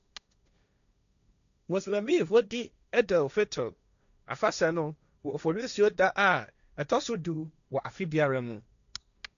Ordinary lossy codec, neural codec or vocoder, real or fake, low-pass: MP3, 96 kbps; codec, 16 kHz, 1.1 kbps, Voila-Tokenizer; fake; 7.2 kHz